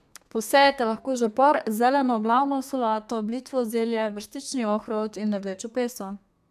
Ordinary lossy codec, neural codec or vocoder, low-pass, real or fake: AAC, 96 kbps; codec, 32 kHz, 1.9 kbps, SNAC; 14.4 kHz; fake